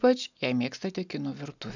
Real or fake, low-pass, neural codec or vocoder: real; 7.2 kHz; none